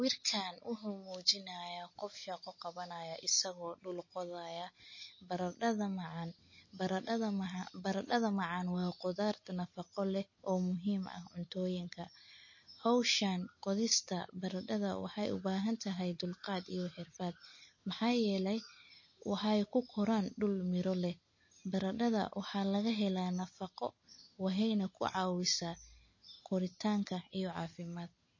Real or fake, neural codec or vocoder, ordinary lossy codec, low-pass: real; none; MP3, 32 kbps; 7.2 kHz